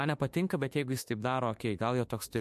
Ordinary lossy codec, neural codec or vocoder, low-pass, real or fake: MP3, 64 kbps; autoencoder, 48 kHz, 32 numbers a frame, DAC-VAE, trained on Japanese speech; 14.4 kHz; fake